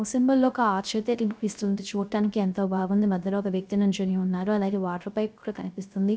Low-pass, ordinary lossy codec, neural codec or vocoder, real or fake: none; none; codec, 16 kHz, 0.3 kbps, FocalCodec; fake